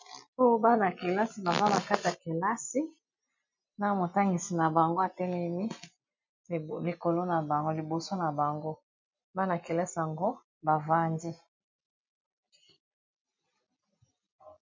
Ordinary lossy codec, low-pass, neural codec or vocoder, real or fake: MP3, 48 kbps; 7.2 kHz; none; real